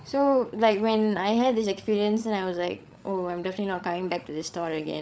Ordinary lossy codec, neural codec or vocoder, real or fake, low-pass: none; codec, 16 kHz, 8 kbps, FreqCodec, larger model; fake; none